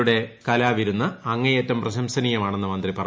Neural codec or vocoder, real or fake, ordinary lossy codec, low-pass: none; real; none; none